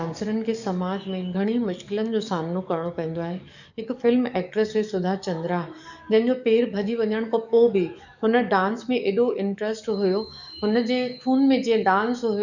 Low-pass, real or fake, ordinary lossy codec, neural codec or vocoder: 7.2 kHz; fake; none; codec, 44.1 kHz, 7.8 kbps, DAC